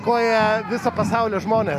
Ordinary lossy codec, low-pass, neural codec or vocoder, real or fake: AAC, 64 kbps; 14.4 kHz; none; real